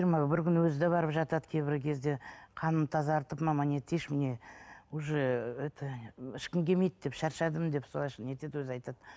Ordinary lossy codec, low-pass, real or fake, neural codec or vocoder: none; none; real; none